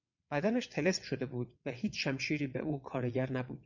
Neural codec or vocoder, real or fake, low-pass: vocoder, 22.05 kHz, 80 mel bands, WaveNeXt; fake; 7.2 kHz